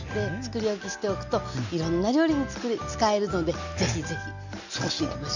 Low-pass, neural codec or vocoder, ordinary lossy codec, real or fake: 7.2 kHz; none; none; real